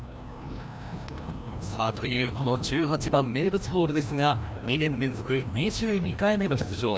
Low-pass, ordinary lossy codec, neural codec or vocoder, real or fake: none; none; codec, 16 kHz, 1 kbps, FreqCodec, larger model; fake